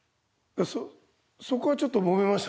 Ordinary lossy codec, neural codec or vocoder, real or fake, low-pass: none; none; real; none